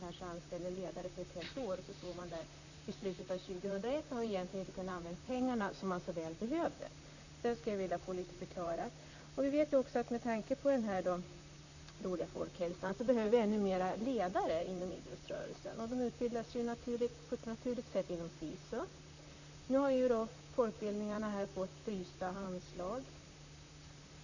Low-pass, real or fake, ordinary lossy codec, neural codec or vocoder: 7.2 kHz; fake; none; vocoder, 44.1 kHz, 128 mel bands, Pupu-Vocoder